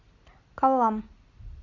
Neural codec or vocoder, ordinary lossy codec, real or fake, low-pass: none; Opus, 64 kbps; real; 7.2 kHz